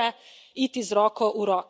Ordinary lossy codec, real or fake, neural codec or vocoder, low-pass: none; real; none; none